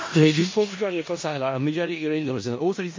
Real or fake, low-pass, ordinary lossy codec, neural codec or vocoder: fake; 7.2 kHz; MP3, 32 kbps; codec, 16 kHz in and 24 kHz out, 0.4 kbps, LongCat-Audio-Codec, four codebook decoder